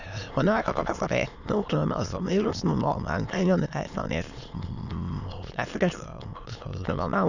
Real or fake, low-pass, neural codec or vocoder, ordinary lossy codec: fake; 7.2 kHz; autoencoder, 22.05 kHz, a latent of 192 numbers a frame, VITS, trained on many speakers; none